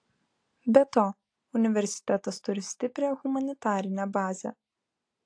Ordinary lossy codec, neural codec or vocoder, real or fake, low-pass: AAC, 48 kbps; none; real; 9.9 kHz